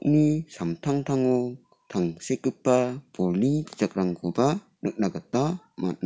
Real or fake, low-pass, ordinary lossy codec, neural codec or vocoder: real; none; none; none